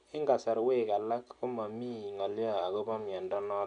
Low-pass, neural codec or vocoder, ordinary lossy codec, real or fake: 9.9 kHz; none; none; real